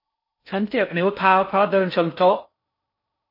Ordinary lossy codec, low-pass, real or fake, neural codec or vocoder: MP3, 32 kbps; 5.4 kHz; fake; codec, 16 kHz in and 24 kHz out, 0.6 kbps, FocalCodec, streaming, 4096 codes